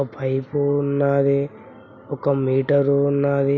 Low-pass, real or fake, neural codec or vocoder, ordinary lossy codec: none; real; none; none